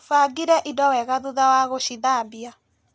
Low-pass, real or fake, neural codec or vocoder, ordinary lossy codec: none; real; none; none